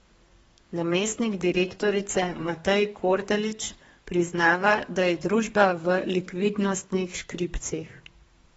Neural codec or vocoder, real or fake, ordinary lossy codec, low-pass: codec, 32 kHz, 1.9 kbps, SNAC; fake; AAC, 24 kbps; 14.4 kHz